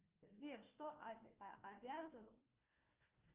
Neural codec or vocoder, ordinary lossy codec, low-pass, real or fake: codec, 16 kHz, 1 kbps, FunCodec, trained on Chinese and English, 50 frames a second; Opus, 32 kbps; 3.6 kHz; fake